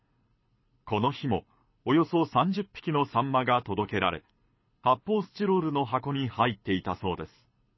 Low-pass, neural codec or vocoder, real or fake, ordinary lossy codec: 7.2 kHz; codec, 24 kHz, 6 kbps, HILCodec; fake; MP3, 24 kbps